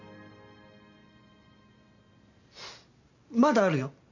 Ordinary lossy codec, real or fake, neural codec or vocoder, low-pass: none; real; none; 7.2 kHz